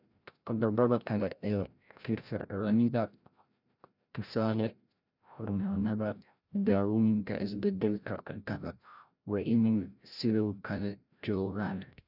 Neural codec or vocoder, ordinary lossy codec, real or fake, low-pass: codec, 16 kHz, 0.5 kbps, FreqCodec, larger model; none; fake; 5.4 kHz